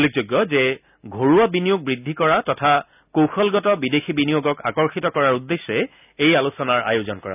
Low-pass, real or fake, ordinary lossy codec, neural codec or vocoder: 3.6 kHz; real; none; none